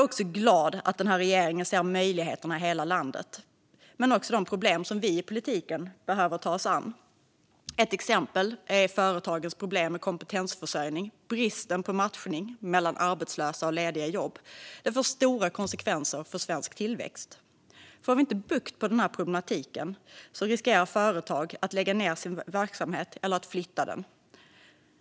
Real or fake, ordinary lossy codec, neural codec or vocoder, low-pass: real; none; none; none